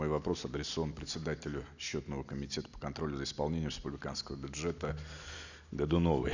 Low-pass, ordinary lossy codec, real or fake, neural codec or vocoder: 7.2 kHz; none; real; none